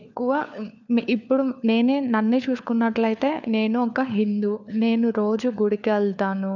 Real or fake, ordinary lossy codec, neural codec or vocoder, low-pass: fake; none; codec, 16 kHz, 4 kbps, FunCodec, trained on LibriTTS, 50 frames a second; 7.2 kHz